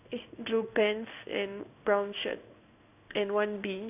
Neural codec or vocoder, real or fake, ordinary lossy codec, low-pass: codec, 16 kHz in and 24 kHz out, 1 kbps, XY-Tokenizer; fake; none; 3.6 kHz